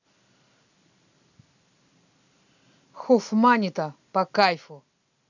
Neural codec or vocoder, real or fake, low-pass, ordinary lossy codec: none; real; 7.2 kHz; none